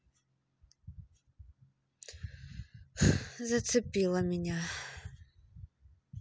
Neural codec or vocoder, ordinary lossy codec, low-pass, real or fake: none; none; none; real